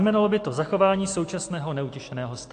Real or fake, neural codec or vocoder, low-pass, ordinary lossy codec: real; none; 9.9 kHz; AAC, 48 kbps